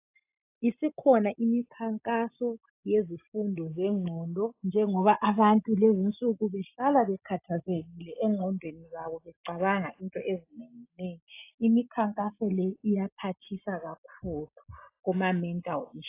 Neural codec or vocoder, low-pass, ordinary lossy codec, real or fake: none; 3.6 kHz; AAC, 24 kbps; real